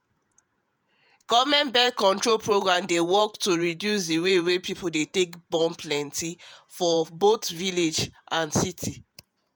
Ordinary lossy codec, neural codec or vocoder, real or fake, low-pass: none; vocoder, 48 kHz, 128 mel bands, Vocos; fake; none